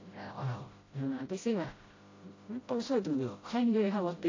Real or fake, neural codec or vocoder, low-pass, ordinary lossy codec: fake; codec, 16 kHz, 0.5 kbps, FreqCodec, smaller model; 7.2 kHz; AAC, 32 kbps